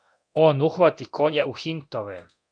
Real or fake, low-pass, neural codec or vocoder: fake; 9.9 kHz; codec, 24 kHz, 0.9 kbps, DualCodec